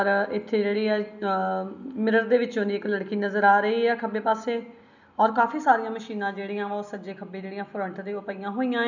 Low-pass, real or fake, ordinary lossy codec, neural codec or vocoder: 7.2 kHz; real; none; none